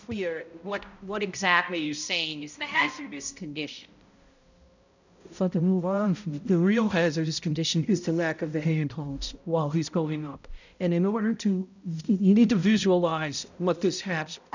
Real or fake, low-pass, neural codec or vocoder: fake; 7.2 kHz; codec, 16 kHz, 0.5 kbps, X-Codec, HuBERT features, trained on balanced general audio